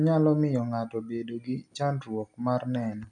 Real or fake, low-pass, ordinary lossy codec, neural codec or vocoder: real; none; none; none